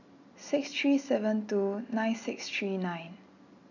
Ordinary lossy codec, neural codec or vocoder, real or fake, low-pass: none; none; real; 7.2 kHz